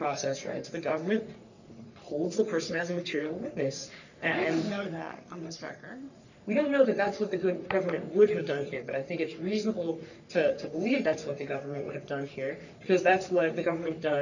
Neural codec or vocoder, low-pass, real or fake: codec, 44.1 kHz, 3.4 kbps, Pupu-Codec; 7.2 kHz; fake